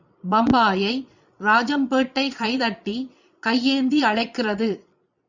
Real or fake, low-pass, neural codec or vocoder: fake; 7.2 kHz; vocoder, 22.05 kHz, 80 mel bands, Vocos